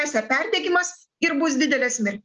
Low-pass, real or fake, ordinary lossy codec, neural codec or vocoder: 7.2 kHz; real; Opus, 24 kbps; none